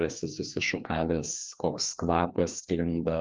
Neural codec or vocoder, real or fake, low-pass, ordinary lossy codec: codec, 16 kHz, 2 kbps, FreqCodec, larger model; fake; 7.2 kHz; Opus, 24 kbps